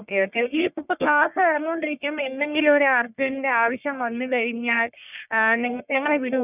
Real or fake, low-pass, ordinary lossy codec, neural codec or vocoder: fake; 3.6 kHz; none; codec, 44.1 kHz, 1.7 kbps, Pupu-Codec